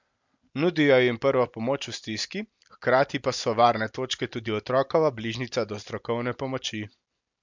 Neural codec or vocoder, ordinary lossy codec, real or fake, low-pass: none; MP3, 64 kbps; real; 7.2 kHz